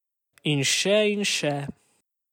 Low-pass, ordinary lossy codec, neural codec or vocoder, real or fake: 19.8 kHz; none; none; real